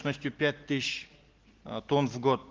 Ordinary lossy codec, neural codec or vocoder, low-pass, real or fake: Opus, 16 kbps; none; 7.2 kHz; real